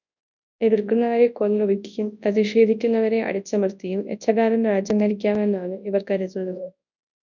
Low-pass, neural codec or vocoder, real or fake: 7.2 kHz; codec, 24 kHz, 0.9 kbps, WavTokenizer, large speech release; fake